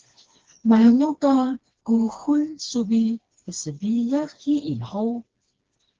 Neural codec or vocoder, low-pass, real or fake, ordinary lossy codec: codec, 16 kHz, 2 kbps, FreqCodec, smaller model; 7.2 kHz; fake; Opus, 16 kbps